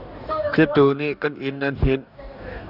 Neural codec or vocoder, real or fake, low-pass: codec, 44.1 kHz, 2.6 kbps, DAC; fake; 5.4 kHz